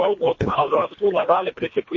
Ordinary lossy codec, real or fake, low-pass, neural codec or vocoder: MP3, 32 kbps; fake; 7.2 kHz; codec, 24 kHz, 1.5 kbps, HILCodec